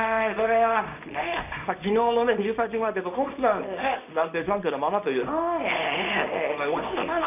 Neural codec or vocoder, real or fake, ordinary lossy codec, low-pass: codec, 24 kHz, 0.9 kbps, WavTokenizer, small release; fake; none; 3.6 kHz